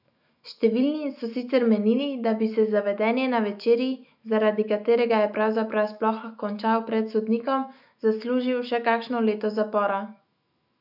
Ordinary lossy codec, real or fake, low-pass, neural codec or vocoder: none; fake; 5.4 kHz; autoencoder, 48 kHz, 128 numbers a frame, DAC-VAE, trained on Japanese speech